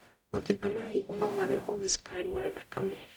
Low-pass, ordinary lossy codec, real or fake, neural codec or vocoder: none; none; fake; codec, 44.1 kHz, 0.9 kbps, DAC